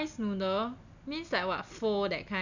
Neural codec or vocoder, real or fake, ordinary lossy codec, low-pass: none; real; none; 7.2 kHz